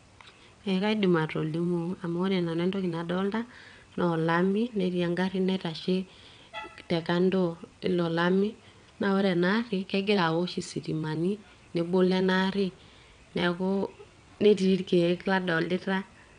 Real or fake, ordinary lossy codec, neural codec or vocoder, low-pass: fake; none; vocoder, 22.05 kHz, 80 mel bands, WaveNeXt; 9.9 kHz